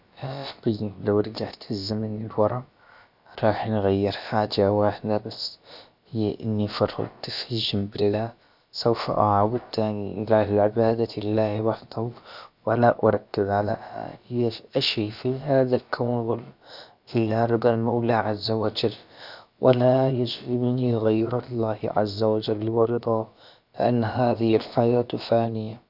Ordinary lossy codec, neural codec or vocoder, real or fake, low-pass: none; codec, 16 kHz, about 1 kbps, DyCAST, with the encoder's durations; fake; 5.4 kHz